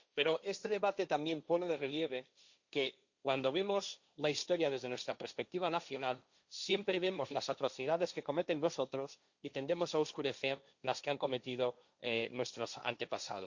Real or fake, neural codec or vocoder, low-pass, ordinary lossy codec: fake; codec, 16 kHz, 1.1 kbps, Voila-Tokenizer; 7.2 kHz; Opus, 64 kbps